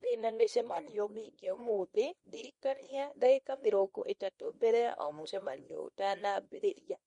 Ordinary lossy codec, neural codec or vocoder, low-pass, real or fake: MP3, 48 kbps; codec, 24 kHz, 0.9 kbps, WavTokenizer, small release; 10.8 kHz; fake